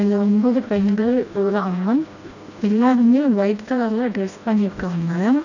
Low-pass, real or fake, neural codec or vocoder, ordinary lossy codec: 7.2 kHz; fake; codec, 16 kHz, 1 kbps, FreqCodec, smaller model; none